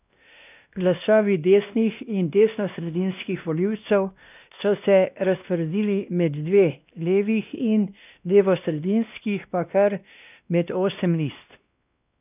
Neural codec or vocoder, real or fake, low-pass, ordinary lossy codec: codec, 16 kHz, 1 kbps, X-Codec, WavLM features, trained on Multilingual LibriSpeech; fake; 3.6 kHz; none